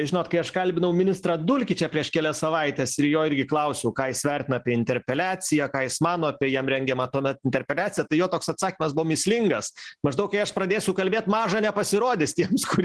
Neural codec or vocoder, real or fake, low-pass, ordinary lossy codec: none; real; 10.8 kHz; Opus, 16 kbps